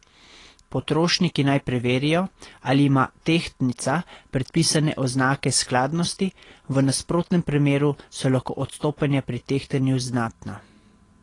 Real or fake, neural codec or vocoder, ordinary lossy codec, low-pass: real; none; AAC, 32 kbps; 10.8 kHz